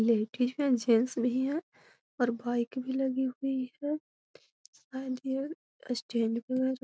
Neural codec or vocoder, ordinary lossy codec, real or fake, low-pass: none; none; real; none